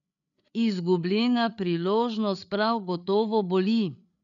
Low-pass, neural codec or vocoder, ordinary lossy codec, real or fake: 7.2 kHz; codec, 16 kHz, 8 kbps, FreqCodec, larger model; MP3, 96 kbps; fake